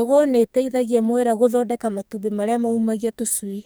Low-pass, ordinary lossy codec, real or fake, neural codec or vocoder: none; none; fake; codec, 44.1 kHz, 2.6 kbps, SNAC